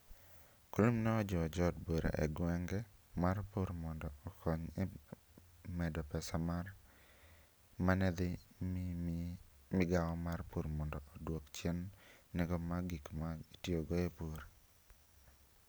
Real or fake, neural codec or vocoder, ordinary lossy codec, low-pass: real; none; none; none